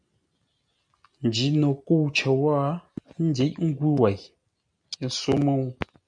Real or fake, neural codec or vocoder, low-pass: real; none; 9.9 kHz